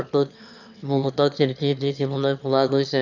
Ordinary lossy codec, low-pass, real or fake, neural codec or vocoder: none; 7.2 kHz; fake; autoencoder, 22.05 kHz, a latent of 192 numbers a frame, VITS, trained on one speaker